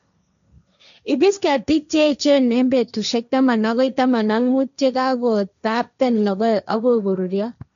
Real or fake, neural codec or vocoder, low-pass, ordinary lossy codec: fake; codec, 16 kHz, 1.1 kbps, Voila-Tokenizer; 7.2 kHz; none